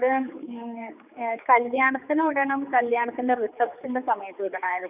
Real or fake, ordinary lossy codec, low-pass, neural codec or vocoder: fake; none; 3.6 kHz; codec, 16 kHz, 4 kbps, X-Codec, HuBERT features, trained on general audio